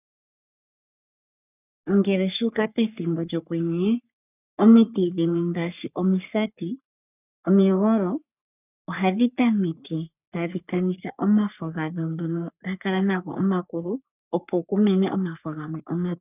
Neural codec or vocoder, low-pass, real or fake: codec, 44.1 kHz, 3.4 kbps, Pupu-Codec; 3.6 kHz; fake